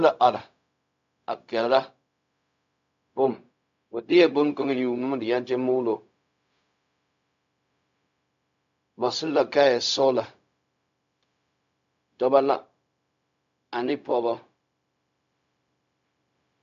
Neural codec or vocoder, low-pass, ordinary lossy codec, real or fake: codec, 16 kHz, 0.4 kbps, LongCat-Audio-Codec; 7.2 kHz; AAC, 64 kbps; fake